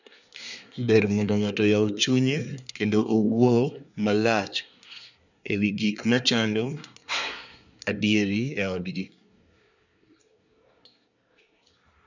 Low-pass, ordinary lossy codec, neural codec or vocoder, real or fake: 7.2 kHz; none; codec, 24 kHz, 1 kbps, SNAC; fake